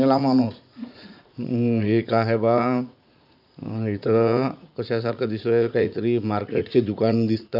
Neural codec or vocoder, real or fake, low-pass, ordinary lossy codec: vocoder, 44.1 kHz, 80 mel bands, Vocos; fake; 5.4 kHz; none